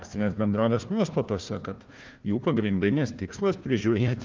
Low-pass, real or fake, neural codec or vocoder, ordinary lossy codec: 7.2 kHz; fake; codec, 16 kHz, 1 kbps, FunCodec, trained on Chinese and English, 50 frames a second; Opus, 24 kbps